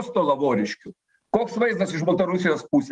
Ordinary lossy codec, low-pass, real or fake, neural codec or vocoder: Opus, 24 kbps; 10.8 kHz; real; none